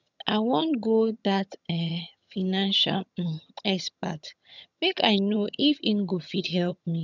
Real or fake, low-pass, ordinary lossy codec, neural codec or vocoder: fake; 7.2 kHz; none; vocoder, 22.05 kHz, 80 mel bands, HiFi-GAN